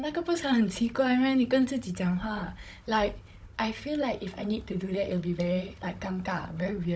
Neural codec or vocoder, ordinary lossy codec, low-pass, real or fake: codec, 16 kHz, 16 kbps, FunCodec, trained on Chinese and English, 50 frames a second; none; none; fake